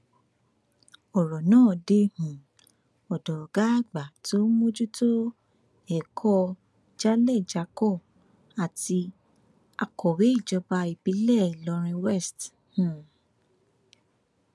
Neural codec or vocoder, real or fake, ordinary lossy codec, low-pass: none; real; none; none